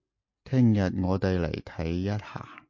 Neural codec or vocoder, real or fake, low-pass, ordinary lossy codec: none; real; 7.2 kHz; MP3, 48 kbps